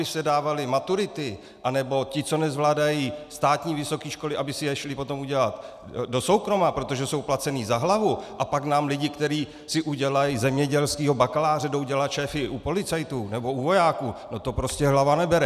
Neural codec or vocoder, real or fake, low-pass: none; real; 14.4 kHz